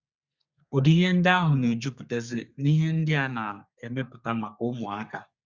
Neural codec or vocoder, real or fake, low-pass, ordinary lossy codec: codec, 32 kHz, 1.9 kbps, SNAC; fake; 7.2 kHz; Opus, 64 kbps